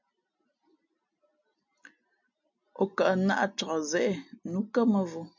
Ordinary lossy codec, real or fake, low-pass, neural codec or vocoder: MP3, 64 kbps; real; 7.2 kHz; none